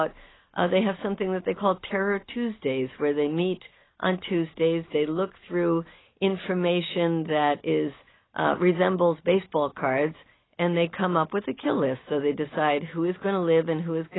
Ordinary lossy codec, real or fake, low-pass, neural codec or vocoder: AAC, 16 kbps; real; 7.2 kHz; none